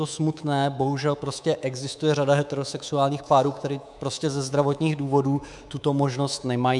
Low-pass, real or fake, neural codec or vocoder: 10.8 kHz; fake; codec, 24 kHz, 3.1 kbps, DualCodec